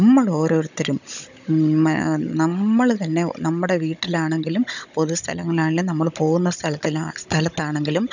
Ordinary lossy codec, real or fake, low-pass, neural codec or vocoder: none; fake; 7.2 kHz; codec, 16 kHz, 16 kbps, FunCodec, trained on Chinese and English, 50 frames a second